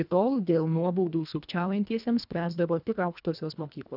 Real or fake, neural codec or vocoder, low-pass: fake; codec, 24 kHz, 1.5 kbps, HILCodec; 5.4 kHz